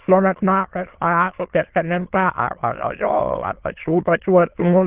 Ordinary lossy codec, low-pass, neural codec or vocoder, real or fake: Opus, 16 kbps; 3.6 kHz; autoencoder, 22.05 kHz, a latent of 192 numbers a frame, VITS, trained on many speakers; fake